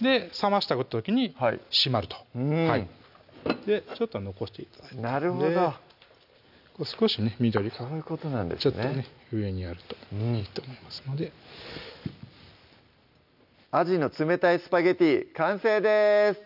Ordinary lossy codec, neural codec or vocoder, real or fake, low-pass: none; none; real; 5.4 kHz